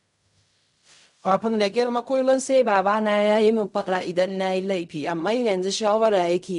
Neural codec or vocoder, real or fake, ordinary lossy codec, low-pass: codec, 16 kHz in and 24 kHz out, 0.4 kbps, LongCat-Audio-Codec, fine tuned four codebook decoder; fake; none; 10.8 kHz